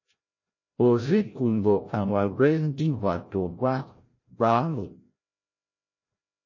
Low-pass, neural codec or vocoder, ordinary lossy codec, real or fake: 7.2 kHz; codec, 16 kHz, 0.5 kbps, FreqCodec, larger model; MP3, 32 kbps; fake